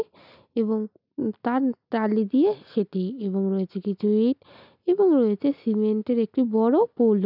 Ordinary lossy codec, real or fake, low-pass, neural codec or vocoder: none; real; 5.4 kHz; none